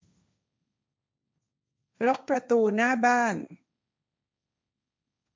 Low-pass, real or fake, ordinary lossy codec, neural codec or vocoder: none; fake; none; codec, 16 kHz, 1.1 kbps, Voila-Tokenizer